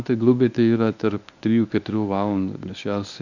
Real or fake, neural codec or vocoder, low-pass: fake; codec, 24 kHz, 0.9 kbps, WavTokenizer, medium speech release version 1; 7.2 kHz